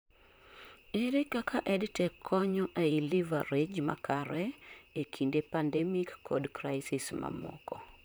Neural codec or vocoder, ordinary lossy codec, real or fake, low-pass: vocoder, 44.1 kHz, 128 mel bands, Pupu-Vocoder; none; fake; none